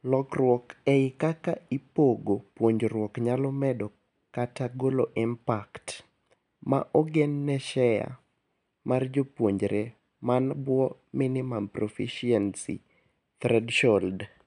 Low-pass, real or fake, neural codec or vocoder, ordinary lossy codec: 10.8 kHz; real; none; none